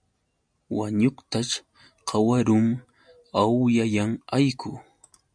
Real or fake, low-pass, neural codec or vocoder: real; 9.9 kHz; none